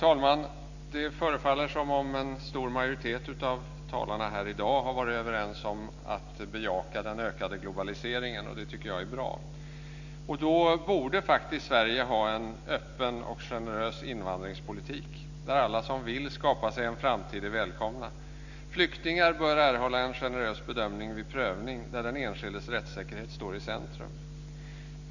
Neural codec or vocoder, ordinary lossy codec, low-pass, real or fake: none; none; 7.2 kHz; real